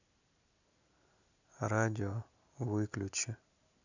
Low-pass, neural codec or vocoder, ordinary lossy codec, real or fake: 7.2 kHz; none; none; real